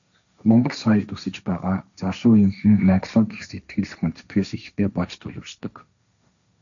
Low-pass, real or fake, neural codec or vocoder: 7.2 kHz; fake; codec, 16 kHz, 1.1 kbps, Voila-Tokenizer